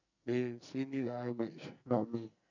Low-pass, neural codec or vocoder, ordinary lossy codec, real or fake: 7.2 kHz; codec, 44.1 kHz, 2.6 kbps, SNAC; none; fake